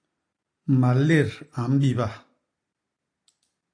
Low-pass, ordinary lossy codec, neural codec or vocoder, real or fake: 9.9 kHz; AAC, 48 kbps; none; real